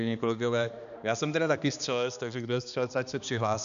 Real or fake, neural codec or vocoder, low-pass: fake; codec, 16 kHz, 2 kbps, X-Codec, HuBERT features, trained on balanced general audio; 7.2 kHz